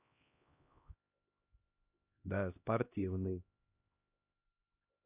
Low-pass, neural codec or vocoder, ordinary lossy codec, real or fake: 3.6 kHz; codec, 16 kHz, 1 kbps, X-Codec, HuBERT features, trained on LibriSpeech; none; fake